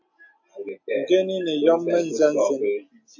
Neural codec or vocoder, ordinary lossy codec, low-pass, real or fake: none; AAC, 48 kbps; 7.2 kHz; real